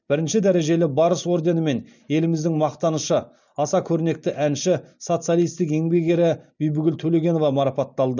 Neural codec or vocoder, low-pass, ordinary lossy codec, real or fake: none; 7.2 kHz; none; real